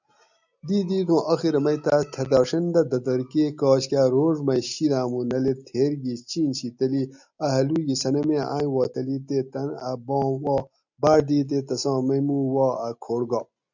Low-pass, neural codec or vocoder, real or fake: 7.2 kHz; none; real